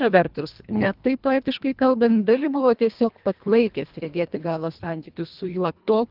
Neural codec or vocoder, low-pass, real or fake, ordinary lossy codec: codec, 24 kHz, 1.5 kbps, HILCodec; 5.4 kHz; fake; Opus, 32 kbps